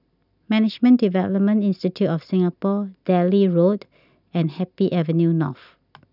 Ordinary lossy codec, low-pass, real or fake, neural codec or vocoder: none; 5.4 kHz; real; none